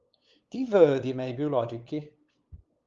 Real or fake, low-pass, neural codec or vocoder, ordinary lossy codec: fake; 7.2 kHz; codec, 16 kHz, 8 kbps, FunCodec, trained on LibriTTS, 25 frames a second; Opus, 32 kbps